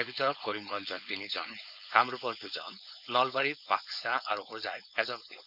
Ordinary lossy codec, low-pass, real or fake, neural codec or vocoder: none; 5.4 kHz; fake; codec, 16 kHz, 4.8 kbps, FACodec